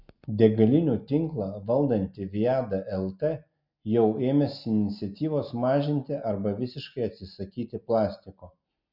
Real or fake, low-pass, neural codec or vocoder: real; 5.4 kHz; none